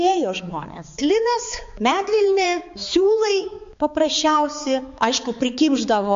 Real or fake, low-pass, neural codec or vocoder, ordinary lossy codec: fake; 7.2 kHz; codec, 16 kHz, 4 kbps, X-Codec, HuBERT features, trained on balanced general audio; MP3, 48 kbps